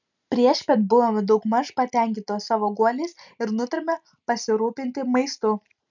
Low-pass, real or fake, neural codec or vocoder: 7.2 kHz; real; none